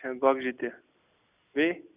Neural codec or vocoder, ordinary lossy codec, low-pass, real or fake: none; none; 3.6 kHz; real